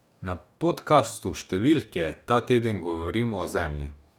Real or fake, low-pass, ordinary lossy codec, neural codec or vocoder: fake; 19.8 kHz; none; codec, 44.1 kHz, 2.6 kbps, DAC